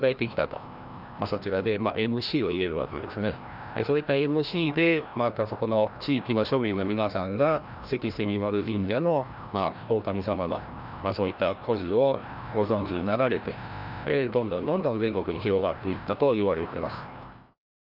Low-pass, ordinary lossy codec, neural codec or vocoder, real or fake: 5.4 kHz; none; codec, 16 kHz, 1 kbps, FreqCodec, larger model; fake